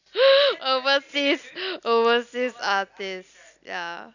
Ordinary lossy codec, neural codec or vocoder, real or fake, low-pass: none; none; real; 7.2 kHz